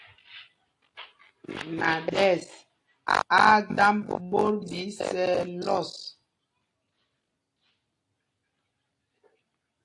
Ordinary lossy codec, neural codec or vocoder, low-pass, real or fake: AAC, 48 kbps; none; 10.8 kHz; real